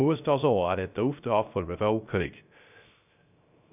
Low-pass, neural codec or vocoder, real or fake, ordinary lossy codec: 3.6 kHz; codec, 16 kHz, 0.3 kbps, FocalCodec; fake; none